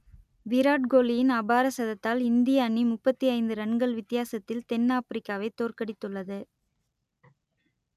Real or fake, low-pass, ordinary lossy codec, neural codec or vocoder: real; 14.4 kHz; none; none